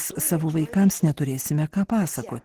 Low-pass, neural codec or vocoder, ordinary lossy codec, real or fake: 14.4 kHz; none; Opus, 16 kbps; real